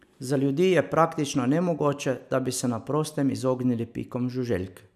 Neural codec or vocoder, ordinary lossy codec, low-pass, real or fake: none; none; 14.4 kHz; real